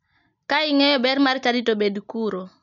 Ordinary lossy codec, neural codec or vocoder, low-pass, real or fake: none; none; 7.2 kHz; real